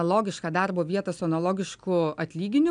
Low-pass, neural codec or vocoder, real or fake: 9.9 kHz; none; real